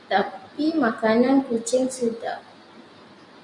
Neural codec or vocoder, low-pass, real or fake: none; 10.8 kHz; real